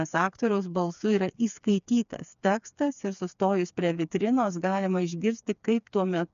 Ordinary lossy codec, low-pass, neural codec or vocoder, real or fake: MP3, 96 kbps; 7.2 kHz; codec, 16 kHz, 4 kbps, FreqCodec, smaller model; fake